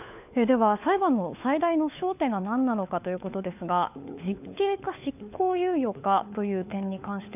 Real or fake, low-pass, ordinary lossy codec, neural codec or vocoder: fake; 3.6 kHz; none; codec, 16 kHz, 4 kbps, FunCodec, trained on LibriTTS, 50 frames a second